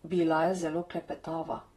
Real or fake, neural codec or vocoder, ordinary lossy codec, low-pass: fake; vocoder, 44.1 kHz, 128 mel bands, Pupu-Vocoder; AAC, 32 kbps; 19.8 kHz